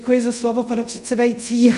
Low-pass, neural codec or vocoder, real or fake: 10.8 kHz; codec, 24 kHz, 0.5 kbps, DualCodec; fake